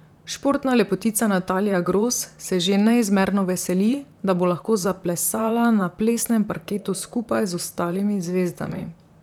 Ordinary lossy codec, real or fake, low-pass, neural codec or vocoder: none; fake; 19.8 kHz; vocoder, 44.1 kHz, 128 mel bands, Pupu-Vocoder